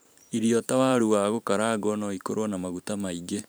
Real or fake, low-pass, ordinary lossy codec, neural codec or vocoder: fake; none; none; vocoder, 44.1 kHz, 128 mel bands every 512 samples, BigVGAN v2